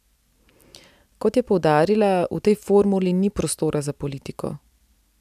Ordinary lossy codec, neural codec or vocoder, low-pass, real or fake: none; none; 14.4 kHz; real